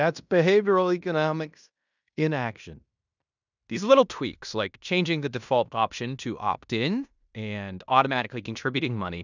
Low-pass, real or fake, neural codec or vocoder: 7.2 kHz; fake; codec, 16 kHz in and 24 kHz out, 0.9 kbps, LongCat-Audio-Codec, fine tuned four codebook decoder